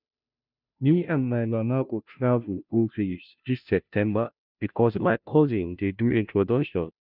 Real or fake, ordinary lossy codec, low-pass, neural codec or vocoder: fake; none; 5.4 kHz; codec, 16 kHz, 0.5 kbps, FunCodec, trained on Chinese and English, 25 frames a second